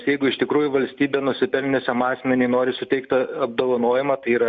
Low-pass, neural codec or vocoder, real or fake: 7.2 kHz; none; real